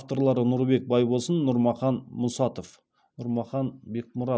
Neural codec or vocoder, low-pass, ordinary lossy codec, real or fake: none; none; none; real